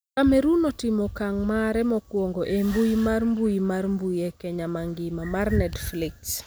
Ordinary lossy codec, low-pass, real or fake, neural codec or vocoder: none; none; real; none